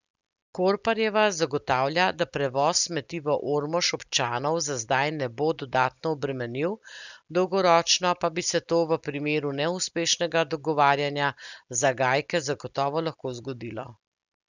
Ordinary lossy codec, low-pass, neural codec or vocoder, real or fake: none; 7.2 kHz; none; real